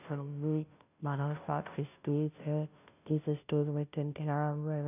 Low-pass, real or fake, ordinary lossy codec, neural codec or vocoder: 3.6 kHz; fake; none; codec, 16 kHz, 0.5 kbps, FunCodec, trained on Chinese and English, 25 frames a second